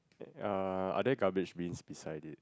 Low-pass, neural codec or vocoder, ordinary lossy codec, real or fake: none; none; none; real